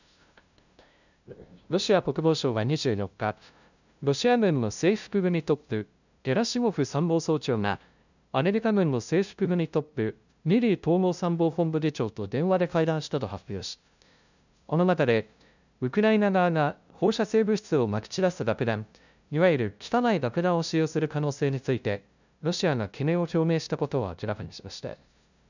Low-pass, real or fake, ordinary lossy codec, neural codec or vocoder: 7.2 kHz; fake; none; codec, 16 kHz, 0.5 kbps, FunCodec, trained on LibriTTS, 25 frames a second